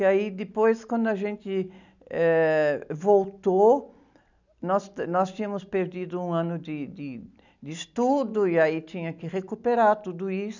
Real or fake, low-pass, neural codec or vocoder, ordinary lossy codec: real; 7.2 kHz; none; none